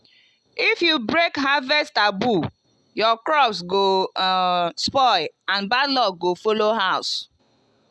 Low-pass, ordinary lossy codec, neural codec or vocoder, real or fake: none; none; none; real